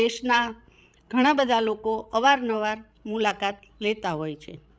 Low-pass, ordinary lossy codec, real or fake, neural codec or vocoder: none; none; fake; codec, 16 kHz, 16 kbps, FreqCodec, larger model